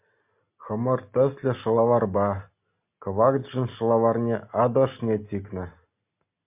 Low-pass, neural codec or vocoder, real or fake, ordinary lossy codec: 3.6 kHz; none; real; MP3, 32 kbps